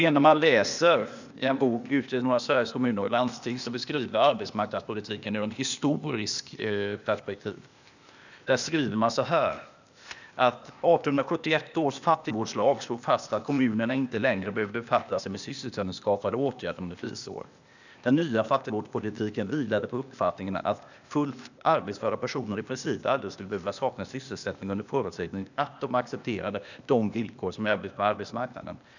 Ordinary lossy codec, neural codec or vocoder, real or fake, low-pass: none; codec, 16 kHz, 0.8 kbps, ZipCodec; fake; 7.2 kHz